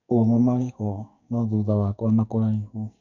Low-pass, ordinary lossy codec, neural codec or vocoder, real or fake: 7.2 kHz; none; codec, 44.1 kHz, 2.6 kbps, SNAC; fake